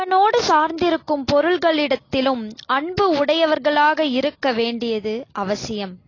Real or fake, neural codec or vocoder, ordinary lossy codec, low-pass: real; none; AAC, 32 kbps; 7.2 kHz